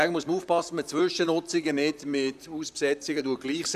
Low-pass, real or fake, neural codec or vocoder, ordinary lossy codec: 14.4 kHz; fake; vocoder, 44.1 kHz, 128 mel bands, Pupu-Vocoder; none